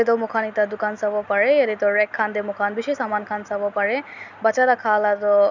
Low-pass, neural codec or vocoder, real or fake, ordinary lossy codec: 7.2 kHz; none; real; none